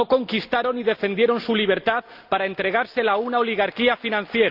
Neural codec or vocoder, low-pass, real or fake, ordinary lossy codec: none; 5.4 kHz; real; Opus, 24 kbps